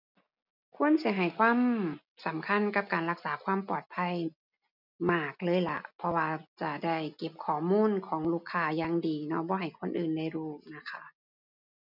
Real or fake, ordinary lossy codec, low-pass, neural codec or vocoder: real; none; 5.4 kHz; none